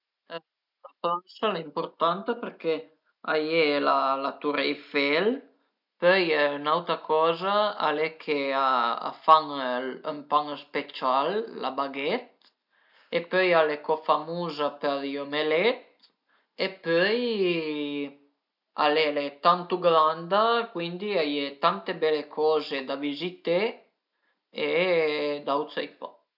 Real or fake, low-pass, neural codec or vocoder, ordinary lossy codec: real; 5.4 kHz; none; none